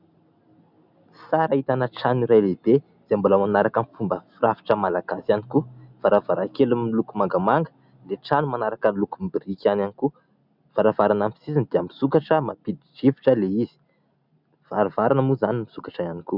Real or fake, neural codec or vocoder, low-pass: real; none; 5.4 kHz